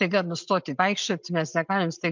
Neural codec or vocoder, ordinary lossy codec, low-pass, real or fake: none; MP3, 64 kbps; 7.2 kHz; real